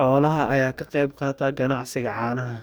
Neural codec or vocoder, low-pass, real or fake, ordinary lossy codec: codec, 44.1 kHz, 2.6 kbps, DAC; none; fake; none